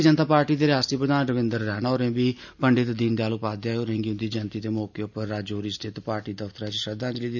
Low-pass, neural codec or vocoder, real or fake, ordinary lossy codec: 7.2 kHz; none; real; none